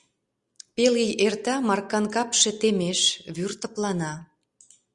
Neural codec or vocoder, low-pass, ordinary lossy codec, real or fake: none; 10.8 kHz; Opus, 64 kbps; real